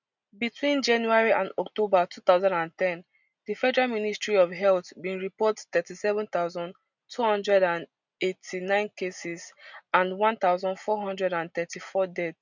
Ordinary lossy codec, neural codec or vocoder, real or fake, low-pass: none; none; real; 7.2 kHz